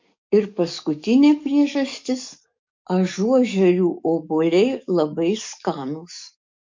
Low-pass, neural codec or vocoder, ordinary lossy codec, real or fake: 7.2 kHz; codec, 44.1 kHz, 7.8 kbps, DAC; MP3, 48 kbps; fake